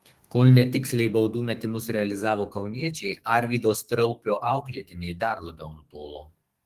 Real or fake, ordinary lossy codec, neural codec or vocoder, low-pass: fake; Opus, 24 kbps; codec, 32 kHz, 1.9 kbps, SNAC; 14.4 kHz